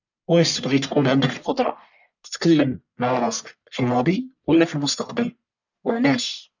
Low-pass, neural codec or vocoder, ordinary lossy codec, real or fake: 7.2 kHz; codec, 44.1 kHz, 1.7 kbps, Pupu-Codec; none; fake